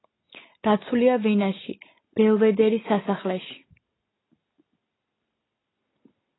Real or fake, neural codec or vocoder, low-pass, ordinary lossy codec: real; none; 7.2 kHz; AAC, 16 kbps